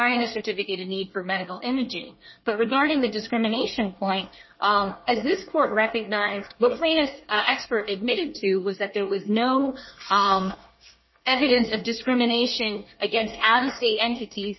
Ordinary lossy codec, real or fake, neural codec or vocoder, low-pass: MP3, 24 kbps; fake; codec, 24 kHz, 1 kbps, SNAC; 7.2 kHz